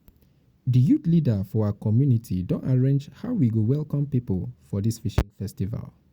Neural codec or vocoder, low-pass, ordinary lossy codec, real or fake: none; 19.8 kHz; Opus, 64 kbps; real